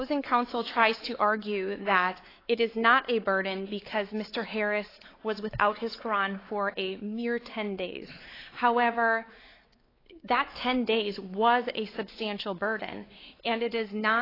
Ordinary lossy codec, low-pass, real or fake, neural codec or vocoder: AAC, 24 kbps; 5.4 kHz; fake; codec, 16 kHz, 4 kbps, X-Codec, WavLM features, trained on Multilingual LibriSpeech